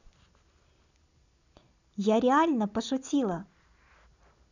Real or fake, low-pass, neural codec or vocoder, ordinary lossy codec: real; 7.2 kHz; none; none